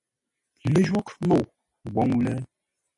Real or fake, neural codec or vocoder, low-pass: real; none; 10.8 kHz